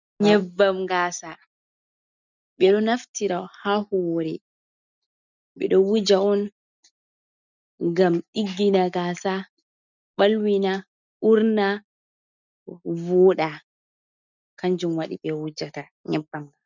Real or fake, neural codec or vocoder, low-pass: real; none; 7.2 kHz